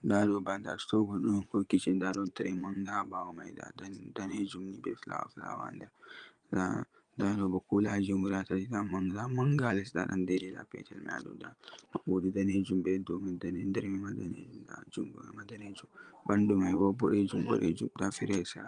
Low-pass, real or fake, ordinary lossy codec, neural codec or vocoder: 9.9 kHz; fake; Opus, 32 kbps; vocoder, 22.05 kHz, 80 mel bands, Vocos